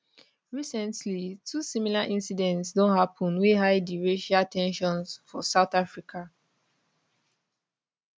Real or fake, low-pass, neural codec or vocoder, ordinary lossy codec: real; none; none; none